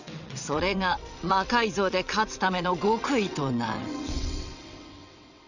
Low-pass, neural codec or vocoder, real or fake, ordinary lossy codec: 7.2 kHz; vocoder, 22.05 kHz, 80 mel bands, WaveNeXt; fake; none